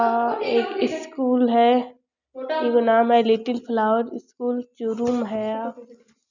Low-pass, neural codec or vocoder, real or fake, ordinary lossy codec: 7.2 kHz; none; real; none